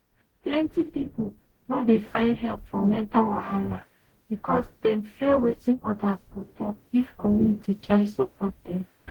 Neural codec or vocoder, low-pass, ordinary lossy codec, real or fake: codec, 44.1 kHz, 0.9 kbps, DAC; 19.8 kHz; Opus, 16 kbps; fake